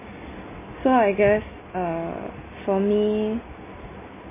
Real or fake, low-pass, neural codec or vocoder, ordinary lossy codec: real; 3.6 kHz; none; MP3, 16 kbps